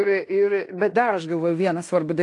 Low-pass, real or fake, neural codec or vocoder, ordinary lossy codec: 10.8 kHz; fake; codec, 16 kHz in and 24 kHz out, 0.9 kbps, LongCat-Audio-Codec, fine tuned four codebook decoder; AAC, 48 kbps